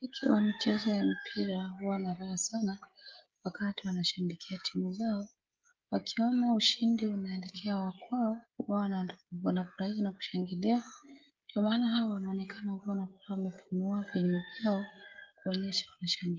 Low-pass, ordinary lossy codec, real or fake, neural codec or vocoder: 7.2 kHz; Opus, 32 kbps; fake; codec, 16 kHz, 16 kbps, FreqCodec, smaller model